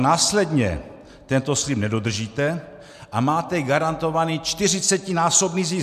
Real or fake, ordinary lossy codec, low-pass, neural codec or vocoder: real; MP3, 96 kbps; 14.4 kHz; none